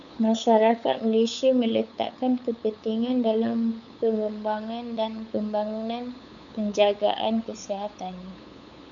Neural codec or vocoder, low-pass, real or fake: codec, 16 kHz, 8 kbps, FunCodec, trained on LibriTTS, 25 frames a second; 7.2 kHz; fake